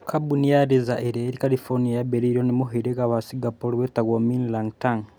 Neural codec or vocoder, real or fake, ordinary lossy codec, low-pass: none; real; none; none